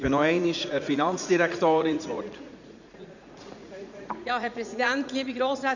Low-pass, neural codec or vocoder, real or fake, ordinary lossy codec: 7.2 kHz; vocoder, 44.1 kHz, 80 mel bands, Vocos; fake; none